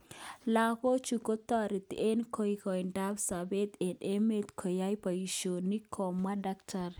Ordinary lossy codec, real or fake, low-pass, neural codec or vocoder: none; real; none; none